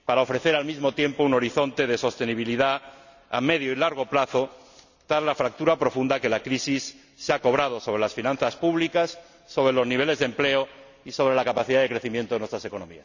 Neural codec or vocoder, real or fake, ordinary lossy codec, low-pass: none; real; none; 7.2 kHz